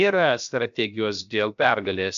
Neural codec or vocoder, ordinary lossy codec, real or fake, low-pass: codec, 16 kHz, 0.7 kbps, FocalCodec; AAC, 96 kbps; fake; 7.2 kHz